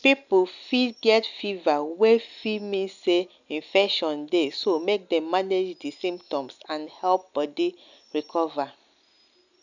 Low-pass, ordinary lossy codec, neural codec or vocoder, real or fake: 7.2 kHz; none; none; real